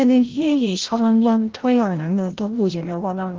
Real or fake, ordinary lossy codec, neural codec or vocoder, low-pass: fake; Opus, 16 kbps; codec, 16 kHz, 0.5 kbps, FreqCodec, larger model; 7.2 kHz